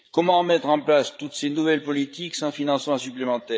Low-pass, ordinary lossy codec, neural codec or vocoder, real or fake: none; none; codec, 16 kHz, 8 kbps, FreqCodec, larger model; fake